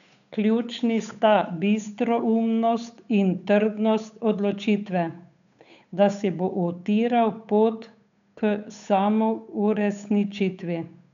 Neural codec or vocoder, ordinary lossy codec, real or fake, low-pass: codec, 16 kHz, 8 kbps, FunCodec, trained on Chinese and English, 25 frames a second; none; fake; 7.2 kHz